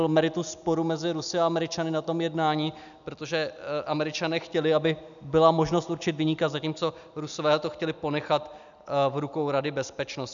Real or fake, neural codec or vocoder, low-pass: real; none; 7.2 kHz